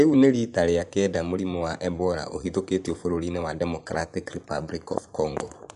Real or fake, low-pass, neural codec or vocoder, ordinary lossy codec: fake; 10.8 kHz; vocoder, 24 kHz, 100 mel bands, Vocos; none